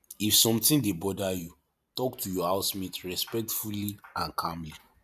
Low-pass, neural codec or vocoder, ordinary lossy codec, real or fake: 14.4 kHz; vocoder, 44.1 kHz, 128 mel bands every 512 samples, BigVGAN v2; none; fake